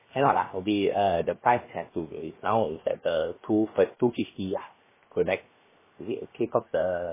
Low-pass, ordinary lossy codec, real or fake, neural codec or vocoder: 3.6 kHz; MP3, 16 kbps; fake; codec, 16 kHz, 0.7 kbps, FocalCodec